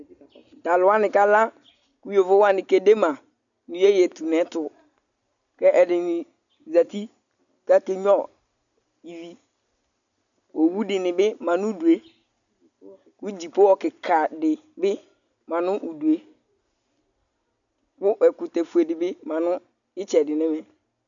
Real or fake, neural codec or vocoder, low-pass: real; none; 7.2 kHz